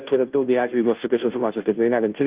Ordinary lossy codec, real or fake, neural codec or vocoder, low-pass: Opus, 32 kbps; fake; codec, 16 kHz, 0.5 kbps, FunCodec, trained on Chinese and English, 25 frames a second; 3.6 kHz